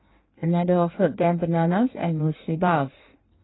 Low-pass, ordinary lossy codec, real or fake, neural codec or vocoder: 7.2 kHz; AAC, 16 kbps; fake; codec, 16 kHz in and 24 kHz out, 1.1 kbps, FireRedTTS-2 codec